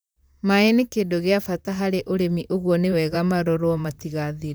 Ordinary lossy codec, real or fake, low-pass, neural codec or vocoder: none; fake; none; vocoder, 44.1 kHz, 128 mel bands, Pupu-Vocoder